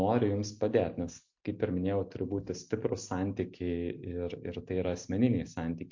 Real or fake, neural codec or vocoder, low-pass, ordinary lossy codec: real; none; 7.2 kHz; MP3, 48 kbps